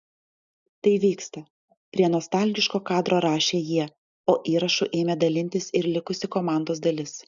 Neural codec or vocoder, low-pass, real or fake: none; 7.2 kHz; real